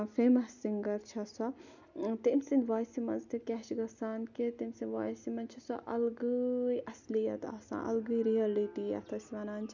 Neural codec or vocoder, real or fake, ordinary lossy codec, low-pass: none; real; none; 7.2 kHz